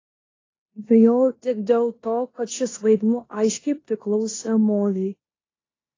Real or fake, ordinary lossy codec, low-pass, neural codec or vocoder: fake; AAC, 32 kbps; 7.2 kHz; codec, 16 kHz in and 24 kHz out, 0.9 kbps, LongCat-Audio-Codec, four codebook decoder